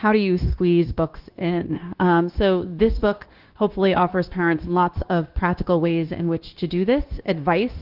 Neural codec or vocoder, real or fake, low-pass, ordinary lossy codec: codec, 16 kHz, 0.9 kbps, LongCat-Audio-Codec; fake; 5.4 kHz; Opus, 16 kbps